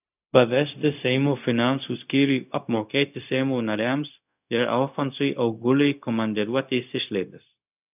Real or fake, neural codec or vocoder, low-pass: fake; codec, 16 kHz, 0.4 kbps, LongCat-Audio-Codec; 3.6 kHz